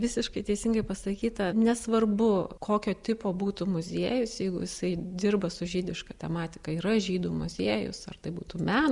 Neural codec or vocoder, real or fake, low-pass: none; real; 10.8 kHz